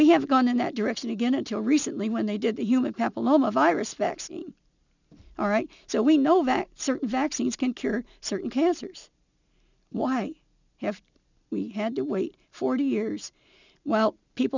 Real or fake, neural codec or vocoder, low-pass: real; none; 7.2 kHz